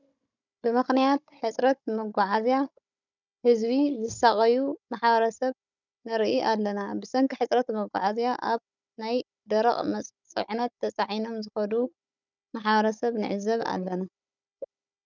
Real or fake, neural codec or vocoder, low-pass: fake; codec, 16 kHz, 16 kbps, FunCodec, trained on Chinese and English, 50 frames a second; 7.2 kHz